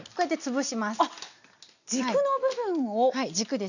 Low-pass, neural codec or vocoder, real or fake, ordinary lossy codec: 7.2 kHz; none; real; none